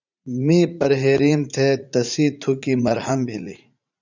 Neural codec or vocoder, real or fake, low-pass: vocoder, 44.1 kHz, 80 mel bands, Vocos; fake; 7.2 kHz